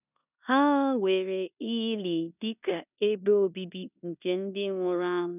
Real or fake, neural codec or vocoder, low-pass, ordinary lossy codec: fake; codec, 16 kHz in and 24 kHz out, 0.9 kbps, LongCat-Audio-Codec, four codebook decoder; 3.6 kHz; none